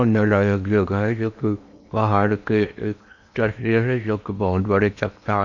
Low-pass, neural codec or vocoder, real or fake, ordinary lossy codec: 7.2 kHz; codec, 16 kHz in and 24 kHz out, 0.8 kbps, FocalCodec, streaming, 65536 codes; fake; none